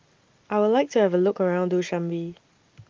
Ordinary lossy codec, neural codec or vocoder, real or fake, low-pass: Opus, 32 kbps; none; real; 7.2 kHz